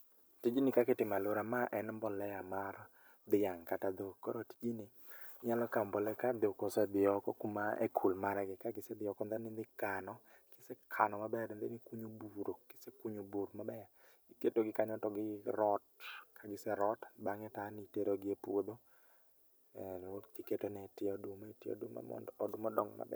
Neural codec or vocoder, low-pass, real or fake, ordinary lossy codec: vocoder, 44.1 kHz, 128 mel bands every 256 samples, BigVGAN v2; none; fake; none